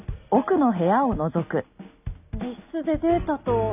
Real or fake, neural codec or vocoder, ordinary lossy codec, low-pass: real; none; none; 3.6 kHz